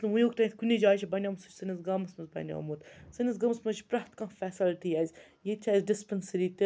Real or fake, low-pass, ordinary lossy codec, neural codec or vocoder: real; none; none; none